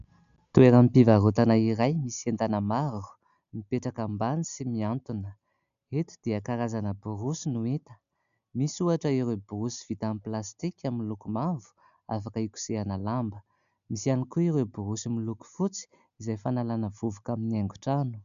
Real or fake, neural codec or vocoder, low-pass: real; none; 7.2 kHz